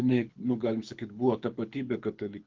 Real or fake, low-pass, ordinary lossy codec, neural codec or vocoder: fake; 7.2 kHz; Opus, 32 kbps; codec, 24 kHz, 6 kbps, HILCodec